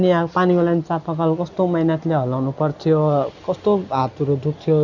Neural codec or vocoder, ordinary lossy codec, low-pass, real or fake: none; none; 7.2 kHz; real